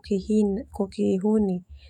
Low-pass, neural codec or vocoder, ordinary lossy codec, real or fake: 19.8 kHz; autoencoder, 48 kHz, 128 numbers a frame, DAC-VAE, trained on Japanese speech; none; fake